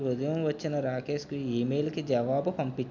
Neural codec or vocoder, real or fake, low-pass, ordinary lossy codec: none; real; 7.2 kHz; none